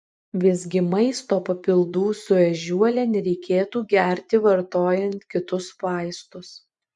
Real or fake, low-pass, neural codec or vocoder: fake; 10.8 kHz; vocoder, 24 kHz, 100 mel bands, Vocos